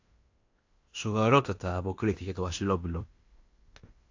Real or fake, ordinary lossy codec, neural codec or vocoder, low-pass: fake; AAC, 48 kbps; codec, 16 kHz in and 24 kHz out, 0.9 kbps, LongCat-Audio-Codec, fine tuned four codebook decoder; 7.2 kHz